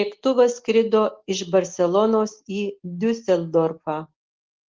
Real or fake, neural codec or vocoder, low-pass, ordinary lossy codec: real; none; 7.2 kHz; Opus, 16 kbps